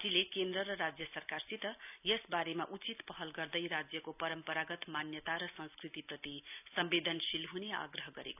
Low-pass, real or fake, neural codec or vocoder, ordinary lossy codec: 3.6 kHz; real; none; none